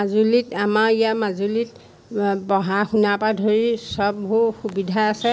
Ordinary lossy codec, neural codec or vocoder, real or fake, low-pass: none; none; real; none